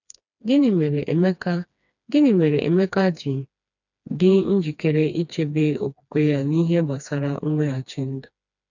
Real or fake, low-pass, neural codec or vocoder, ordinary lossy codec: fake; 7.2 kHz; codec, 16 kHz, 2 kbps, FreqCodec, smaller model; none